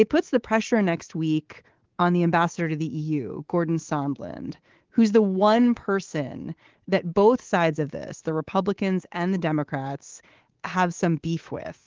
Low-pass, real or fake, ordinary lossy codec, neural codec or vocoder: 7.2 kHz; fake; Opus, 16 kbps; codec, 24 kHz, 3.1 kbps, DualCodec